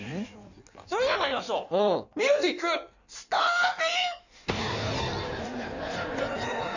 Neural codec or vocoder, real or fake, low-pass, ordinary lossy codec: codec, 16 kHz in and 24 kHz out, 1.1 kbps, FireRedTTS-2 codec; fake; 7.2 kHz; none